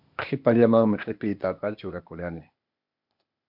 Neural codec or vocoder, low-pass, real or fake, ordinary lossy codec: codec, 16 kHz, 0.8 kbps, ZipCodec; 5.4 kHz; fake; MP3, 48 kbps